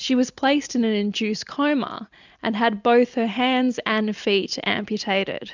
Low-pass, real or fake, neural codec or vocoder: 7.2 kHz; real; none